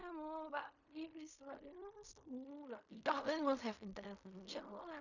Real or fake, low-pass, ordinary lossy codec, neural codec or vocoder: fake; 7.2 kHz; none; codec, 16 kHz in and 24 kHz out, 0.4 kbps, LongCat-Audio-Codec, fine tuned four codebook decoder